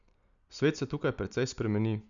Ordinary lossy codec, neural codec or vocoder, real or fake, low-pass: none; none; real; 7.2 kHz